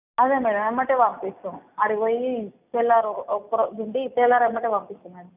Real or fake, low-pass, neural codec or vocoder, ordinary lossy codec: real; 3.6 kHz; none; none